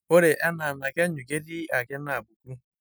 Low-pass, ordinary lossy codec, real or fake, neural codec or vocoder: none; none; real; none